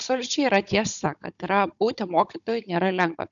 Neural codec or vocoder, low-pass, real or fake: codec, 16 kHz, 16 kbps, FunCodec, trained on Chinese and English, 50 frames a second; 7.2 kHz; fake